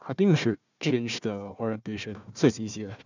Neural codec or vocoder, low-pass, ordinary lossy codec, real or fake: codec, 16 kHz, 1 kbps, FunCodec, trained on Chinese and English, 50 frames a second; 7.2 kHz; none; fake